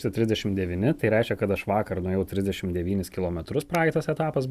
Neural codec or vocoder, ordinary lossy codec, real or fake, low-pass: none; Opus, 64 kbps; real; 14.4 kHz